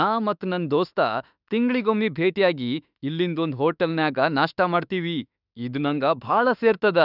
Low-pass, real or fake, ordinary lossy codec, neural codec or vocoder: 5.4 kHz; fake; none; autoencoder, 48 kHz, 32 numbers a frame, DAC-VAE, trained on Japanese speech